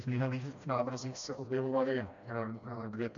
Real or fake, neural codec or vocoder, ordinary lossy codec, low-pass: fake; codec, 16 kHz, 1 kbps, FreqCodec, smaller model; MP3, 64 kbps; 7.2 kHz